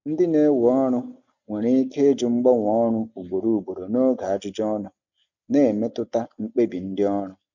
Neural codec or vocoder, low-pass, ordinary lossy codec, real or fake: none; 7.2 kHz; none; real